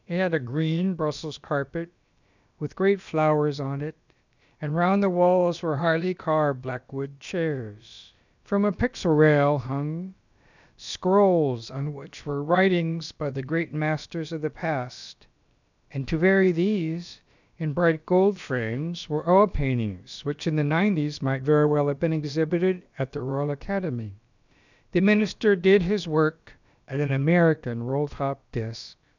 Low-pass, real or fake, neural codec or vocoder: 7.2 kHz; fake; codec, 16 kHz, about 1 kbps, DyCAST, with the encoder's durations